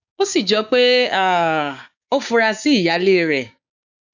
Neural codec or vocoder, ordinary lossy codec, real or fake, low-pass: codec, 16 kHz, 6 kbps, DAC; none; fake; 7.2 kHz